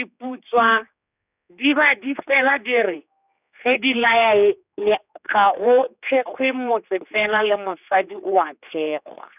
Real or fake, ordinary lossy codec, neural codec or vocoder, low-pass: fake; none; codec, 16 kHz, 4 kbps, X-Codec, HuBERT features, trained on general audio; 3.6 kHz